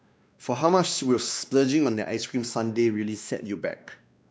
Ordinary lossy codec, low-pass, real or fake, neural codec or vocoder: none; none; fake; codec, 16 kHz, 2 kbps, X-Codec, WavLM features, trained on Multilingual LibriSpeech